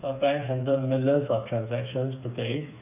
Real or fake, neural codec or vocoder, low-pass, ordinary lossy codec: fake; codec, 16 kHz, 4 kbps, FreqCodec, smaller model; 3.6 kHz; none